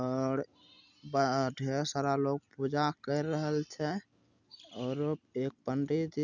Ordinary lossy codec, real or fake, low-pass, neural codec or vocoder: none; real; 7.2 kHz; none